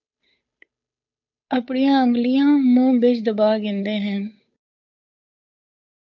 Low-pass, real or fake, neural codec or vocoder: 7.2 kHz; fake; codec, 16 kHz, 8 kbps, FunCodec, trained on Chinese and English, 25 frames a second